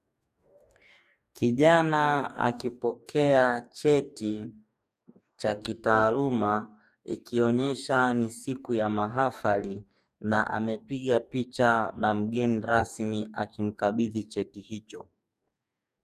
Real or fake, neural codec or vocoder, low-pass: fake; codec, 44.1 kHz, 2.6 kbps, DAC; 14.4 kHz